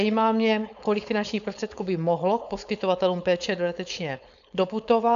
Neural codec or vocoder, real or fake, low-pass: codec, 16 kHz, 4.8 kbps, FACodec; fake; 7.2 kHz